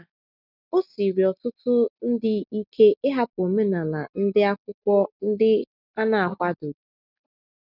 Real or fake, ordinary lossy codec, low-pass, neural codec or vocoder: real; none; 5.4 kHz; none